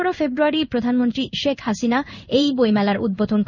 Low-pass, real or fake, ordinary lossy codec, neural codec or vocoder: 7.2 kHz; fake; none; codec, 16 kHz in and 24 kHz out, 1 kbps, XY-Tokenizer